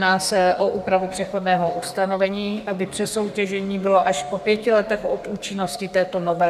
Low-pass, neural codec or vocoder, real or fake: 14.4 kHz; codec, 32 kHz, 1.9 kbps, SNAC; fake